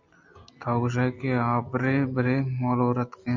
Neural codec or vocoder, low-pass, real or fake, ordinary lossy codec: none; 7.2 kHz; real; AAC, 48 kbps